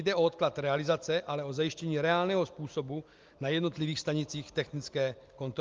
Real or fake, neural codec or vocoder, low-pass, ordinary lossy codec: real; none; 7.2 kHz; Opus, 32 kbps